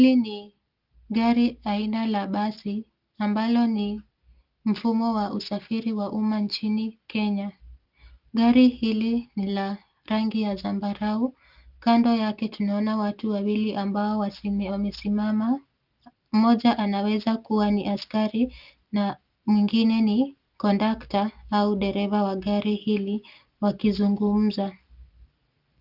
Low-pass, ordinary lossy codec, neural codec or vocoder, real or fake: 5.4 kHz; Opus, 24 kbps; none; real